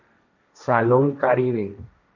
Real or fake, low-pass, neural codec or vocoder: fake; 7.2 kHz; codec, 16 kHz, 1.1 kbps, Voila-Tokenizer